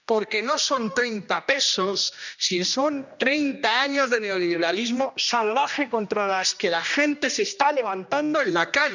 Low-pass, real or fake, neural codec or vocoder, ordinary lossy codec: 7.2 kHz; fake; codec, 16 kHz, 1 kbps, X-Codec, HuBERT features, trained on general audio; none